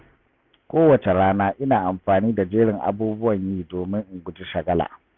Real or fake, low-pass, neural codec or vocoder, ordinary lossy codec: real; 7.2 kHz; none; none